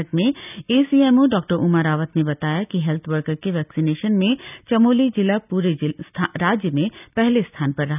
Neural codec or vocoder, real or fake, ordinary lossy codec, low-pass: none; real; none; 3.6 kHz